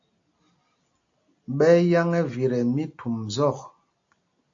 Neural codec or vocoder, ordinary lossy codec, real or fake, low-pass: none; AAC, 48 kbps; real; 7.2 kHz